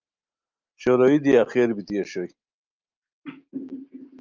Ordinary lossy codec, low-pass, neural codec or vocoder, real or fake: Opus, 24 kbps; 7.2 kHz; none; real